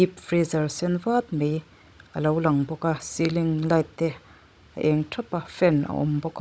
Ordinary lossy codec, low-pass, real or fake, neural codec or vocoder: none; none; fake; codec, 16 kHz, 16 kbps, FunCodec, trained on Chinese and English, 50 frames a second